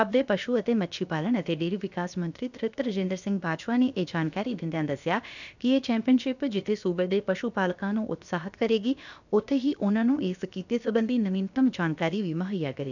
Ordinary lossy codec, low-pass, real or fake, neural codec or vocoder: none; 7.2 kHz; fake; codec, 16 kHz, about 1 kbps, DyCAST, with the encoder's durations